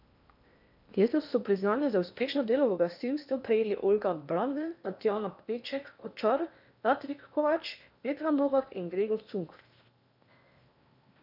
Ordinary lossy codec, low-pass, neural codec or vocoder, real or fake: none; 5.4 kHz; codec, 16 kHz in and 24 kHz out, 0.8 kbps, FocalCodec, streaming, 65536 codes; fake